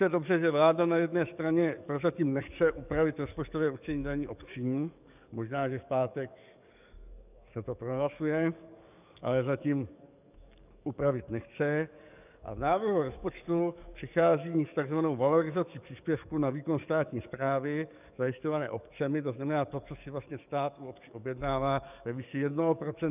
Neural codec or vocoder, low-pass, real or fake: codec, 44.1 kHz, 7.8 kbps, DAC; 3.6 kHz; fake